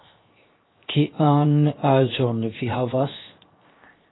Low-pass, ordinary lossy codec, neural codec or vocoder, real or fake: 7.2 kHz; AAC, 16 kbps; codec, 16 kHz, 0.7 kbps, FocalCodec; fake